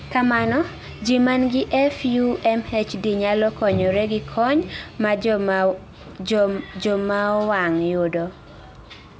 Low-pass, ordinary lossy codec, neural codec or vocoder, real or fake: none; none; none; real